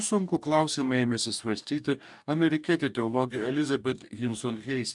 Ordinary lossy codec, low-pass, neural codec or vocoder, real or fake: MP3, 96 kbps; 10.8 kHz; codec, 44.1 kHz, 2.6 kbps, DAC; fake